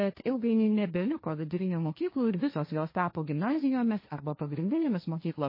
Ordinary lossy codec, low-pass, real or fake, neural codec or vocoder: MP3, 24 kbps; 5.4 kHz; fake; codec, 16 kHz, 1.1 kbps, Voila-Tokenizer